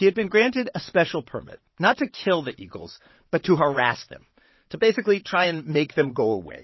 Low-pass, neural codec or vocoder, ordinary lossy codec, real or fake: 7.2 kHz; vocoder, 22.05 kHz, 80 mel bands, WaveNeXt; MP3, 24 kbps; fake